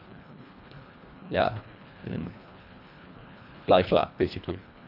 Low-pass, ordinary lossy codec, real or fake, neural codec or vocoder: 5.4 kHz; none; fake; codec, 24 kHz, 1.5 kbps, HILCodec